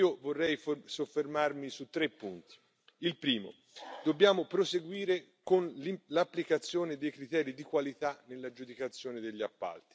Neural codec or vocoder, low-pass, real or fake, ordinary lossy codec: none; none; real; none